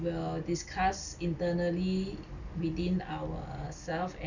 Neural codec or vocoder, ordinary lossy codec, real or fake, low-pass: none; none; real; 7.2 kHz